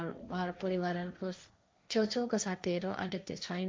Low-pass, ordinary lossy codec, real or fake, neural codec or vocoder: none; none; fake; codec, 16 kHz, 1.1 kbps, Voila-Tokenizer